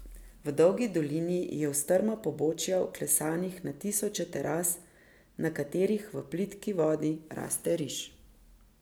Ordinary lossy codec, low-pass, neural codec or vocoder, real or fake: none; none; none; real